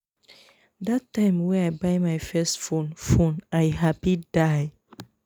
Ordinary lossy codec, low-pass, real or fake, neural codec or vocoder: none; none; real; none